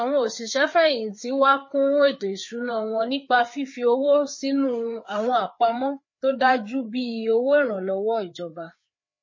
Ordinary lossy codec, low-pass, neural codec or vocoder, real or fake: MP3, 32 kbps; 7.2 kHz; codec, 16 kHz, 4 kbps, FreqCodec, larger model; fake